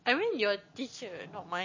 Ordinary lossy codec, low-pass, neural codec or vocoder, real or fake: MP3, 32 kbps; 7.2 kHz; none; real